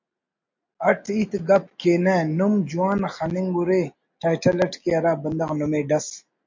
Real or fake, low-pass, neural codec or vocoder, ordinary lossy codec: real; 7.2 kHz; none; MP3, 48 kbps